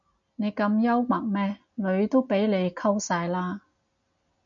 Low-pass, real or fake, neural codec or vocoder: 7.2 kHz; real; none